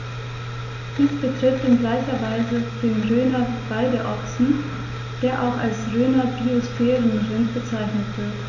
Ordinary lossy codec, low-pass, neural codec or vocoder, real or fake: none; 7.2 kHz; none; real